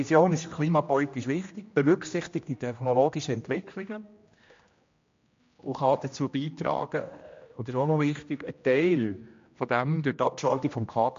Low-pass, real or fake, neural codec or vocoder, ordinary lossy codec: 7.2 kHz; fake; codec, 16 kHz, 1 kbps, X-Codec, HuBERT features, trained on general audio; MP3, 48 kbps